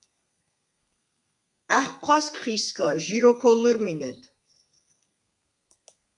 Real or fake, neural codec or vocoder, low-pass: fake; codec, 32 kHz, 1.9 kbps, SNAC; 10.8 kHz